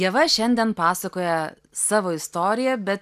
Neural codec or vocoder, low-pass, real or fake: none; 14.4 kHz; real